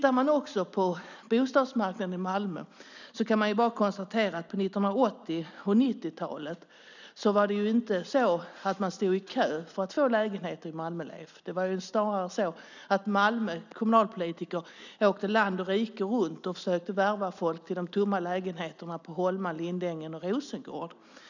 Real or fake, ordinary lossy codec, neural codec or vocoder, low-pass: real; none; none; 7.2 kHz